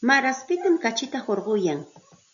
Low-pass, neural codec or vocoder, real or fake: 7.2 kHz; none; real